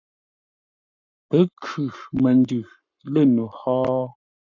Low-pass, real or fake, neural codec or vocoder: 7.2 kHz; fake; codec, 44.1 kHz, 7.8 kbps, Pupu-Codec